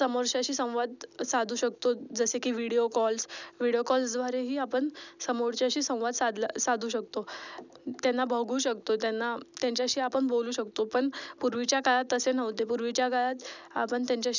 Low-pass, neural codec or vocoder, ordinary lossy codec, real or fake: 7.2 kHz; none; none; real